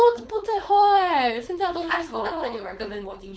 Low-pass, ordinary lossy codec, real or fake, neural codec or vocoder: none; none; fake; codec, 16 kHz, 4.8 kbps, FACodec